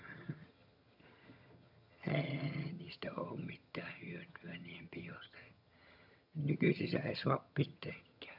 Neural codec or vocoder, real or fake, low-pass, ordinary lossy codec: vocoder, 22.05 kHz, 80 mel bands, HiFi-GAN; fake; 5.4 kHz; none